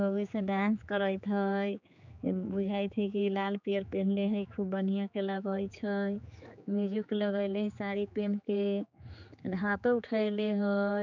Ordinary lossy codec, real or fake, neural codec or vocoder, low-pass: none; fake; codec, 16 kHz, 4 kbps, X-Codec, HuBERT features, trained on general audio; 7.2 kHz